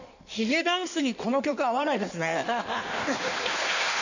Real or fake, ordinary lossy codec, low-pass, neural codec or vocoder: fake; none; 7.2 kHz; codec, 16 kHz in and 24 kHz out, 1.1 kbps, FireRedTTS-2 codec